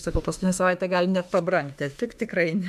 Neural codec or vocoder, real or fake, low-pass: autoencoder, 48 kHz, 32 numbers a frame, DAC-VAE, trained on Japanese speech; fake; 14.4 kHz